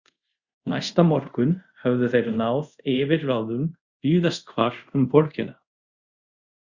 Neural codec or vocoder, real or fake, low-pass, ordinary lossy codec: codec, 24 kHz, 0.5 kbps, DualCodec; fake; 7.2 kHz; Opus, 64 kbps